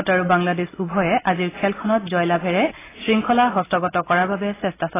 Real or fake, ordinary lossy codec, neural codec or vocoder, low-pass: real; AAC, 16 kbps; none; 3.6 kHz